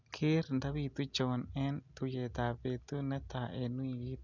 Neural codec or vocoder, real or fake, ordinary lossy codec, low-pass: none; real; none; 7.2 kHz